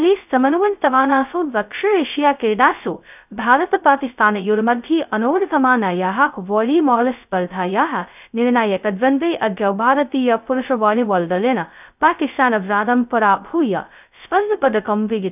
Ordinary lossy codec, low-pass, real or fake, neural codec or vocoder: none; 3.6 kHz; fake; codec, 16 kHz, 0.2 kbps, FocalCodec